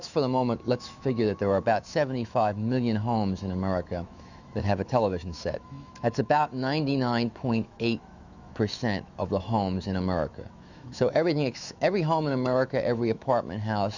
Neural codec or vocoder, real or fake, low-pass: autoencoder, 48 kHz, 128 numbers a frame, DAC-VAE, trained on Japanese speech; fake; 7.2 kHz